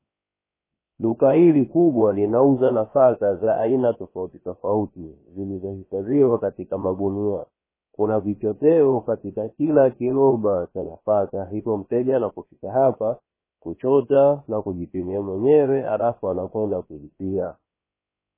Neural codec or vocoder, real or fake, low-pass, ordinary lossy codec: codec, 16 kHz, 0.7 kbps, FocalCodec; fake; 3.6 kHz; MP3, 16 kbps